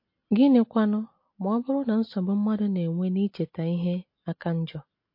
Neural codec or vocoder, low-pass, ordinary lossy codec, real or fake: none; 5.4 kHz; MP3, 32 kbps; real